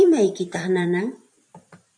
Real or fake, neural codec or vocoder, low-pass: fake; vocoder, 44.1 kHz, 128 mel bands every 512 samples, BigVGAN v2; 9.9 kHz